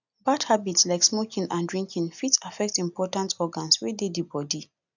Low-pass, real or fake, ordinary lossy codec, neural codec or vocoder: 7.2 kHz; real; none; none